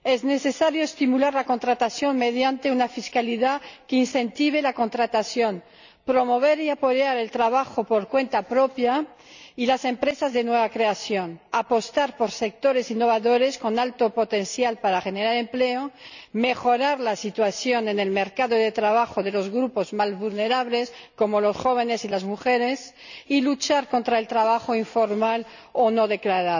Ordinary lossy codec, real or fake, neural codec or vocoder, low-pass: none; real; none; 7.2 kHz